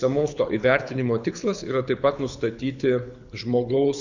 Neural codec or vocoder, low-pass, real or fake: codec, 24 kHz, 6 kbps, HILCodec; 7.2 kHz; fake